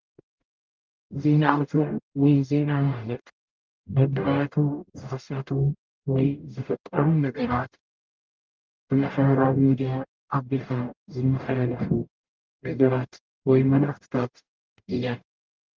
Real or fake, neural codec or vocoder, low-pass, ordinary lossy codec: fake; codec, 44.1 kHz, 0.9 kbps, DAC; 7.2 kHz; Opus, 32 kbps